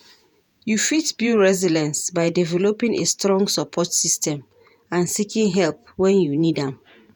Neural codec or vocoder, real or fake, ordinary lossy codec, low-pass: vocoder, 48 kHz, 128 mel bands, Vocos; fake; none; none